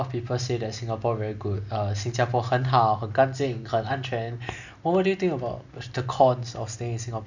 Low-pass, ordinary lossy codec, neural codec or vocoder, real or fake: 7.2 kHz; none; none; real